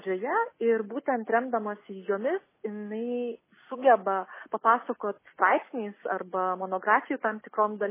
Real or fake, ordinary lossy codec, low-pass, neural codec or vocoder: real; MP3, 16 kbps; 3.6 kHz; none